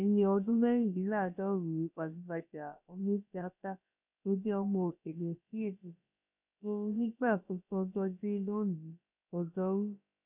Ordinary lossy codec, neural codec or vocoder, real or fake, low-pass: none; codec, 16 kHz, about 1 kbps, DyCAST, with the encoder's durations; fake; 3.6 kHz